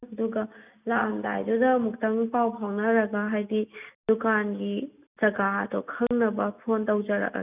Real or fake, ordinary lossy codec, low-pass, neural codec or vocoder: real; none; 3.6 kHz; none